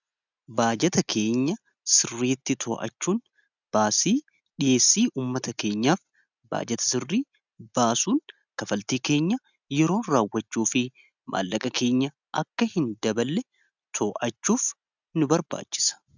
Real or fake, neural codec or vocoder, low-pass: real; none; 7.2 kHz